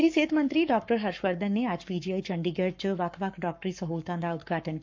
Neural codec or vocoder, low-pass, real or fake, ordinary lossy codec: codec, 44.1 kHz, 7.8 kbps, Pupu-Codec; 7.2 kHz; fake; MP3, 64 kbps